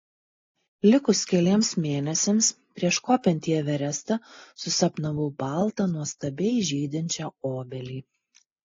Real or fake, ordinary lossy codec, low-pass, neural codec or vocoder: real; AAC, 32 kbps; 7.2 kHz; none